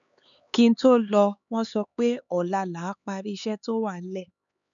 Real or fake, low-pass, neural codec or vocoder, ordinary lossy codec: fake; 7.2 kHz; codec, 16 kHz, 4 kbps, X-Codec, HuBERT features, trained on LibriSpeech; none